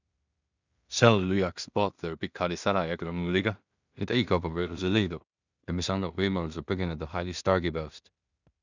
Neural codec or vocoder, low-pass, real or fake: codec, 16 kHz in and 24 kHz out, 0.4 kbps, LongCat-Audio-Codec, two codebook decoder; 7.2 kHz; fake